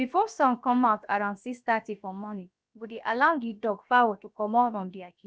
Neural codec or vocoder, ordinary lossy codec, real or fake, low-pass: codec, 16 kHz, about 1 kbps, DyCAST, with the encoder's durations; none; fake; none